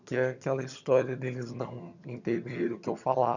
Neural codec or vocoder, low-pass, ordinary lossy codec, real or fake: vocoder, 22.05 kHz, 80 mel bands, HiFi-GAN; 7.2 kHz; none; fake